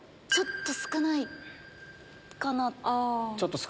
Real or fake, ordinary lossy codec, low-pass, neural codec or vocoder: real; none; none; none